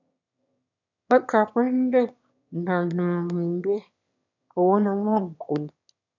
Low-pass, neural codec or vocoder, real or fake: 7.2 kHz; autoencoder, 22.05 kHz, a latent of 192 numbers a frame, VITS, trained on one speaker; fake